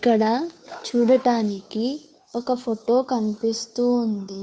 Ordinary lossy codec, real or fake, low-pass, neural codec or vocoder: none; fake; none; codec, 16 kHz, 2 kbps, FunCodec, trained on Chinese and English, 25 frames a second